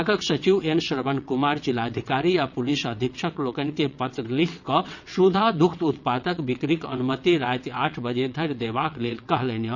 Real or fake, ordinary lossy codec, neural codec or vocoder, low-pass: fake; none; vocoder, 22.05 kHz, 80 mel bands, WaveNeXt; 7.2 kHz